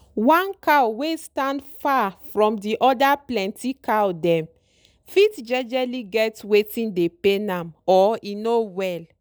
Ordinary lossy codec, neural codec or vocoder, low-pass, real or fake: none; none; none; real